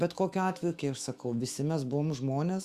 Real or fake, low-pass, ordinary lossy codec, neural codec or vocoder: fake; 14.4 kHz; Opus, 64 kbps; autoencoder, 48 kHz, 128 numbers a frame, DAC-VAE, trained on Japanese speech